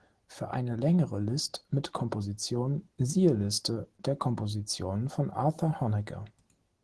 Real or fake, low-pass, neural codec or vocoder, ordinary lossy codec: real; 10.8 kHz; none; Opus, 16 kbps